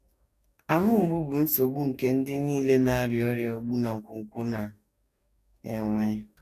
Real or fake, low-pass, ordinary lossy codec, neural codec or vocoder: fake; 14.4 kHz; none; codec, 44.1 kHz, 2.6 kbps, DAC